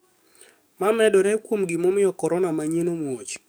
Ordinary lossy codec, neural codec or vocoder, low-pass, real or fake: none; codec, 44.1 kHz, 7.8 kbps, DAC; none; fake